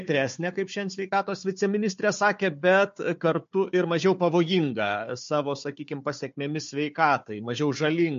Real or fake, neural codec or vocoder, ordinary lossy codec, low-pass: fake; codec, 16 kHz, 4 kbps, FunCodec, trained on Chinese and English, 50 frames a second; MP3, 48 kbps; 7.2 kHz